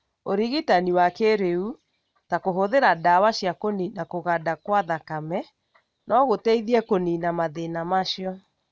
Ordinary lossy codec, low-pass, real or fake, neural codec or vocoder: none; none; real; none